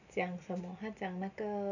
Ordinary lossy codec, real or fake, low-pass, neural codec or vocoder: none; real; 7.2 kHz; none